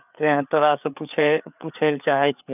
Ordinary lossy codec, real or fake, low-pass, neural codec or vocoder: none; fake; 3.6 kHz; codec, 16 kHz, 4 kbps, FreqCodec, larger model